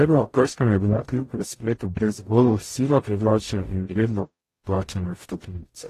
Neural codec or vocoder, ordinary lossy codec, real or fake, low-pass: codec, 44.1 kHz, 0.9 kbps, DAC; AAC, 48 kbps; fake; 14.4 kHz